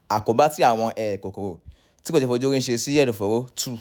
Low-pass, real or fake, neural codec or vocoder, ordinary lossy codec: none; fake; autoencoder, 48 kHz, 128 numbers a frame, DAC-VAE, trained on Japanese speech; none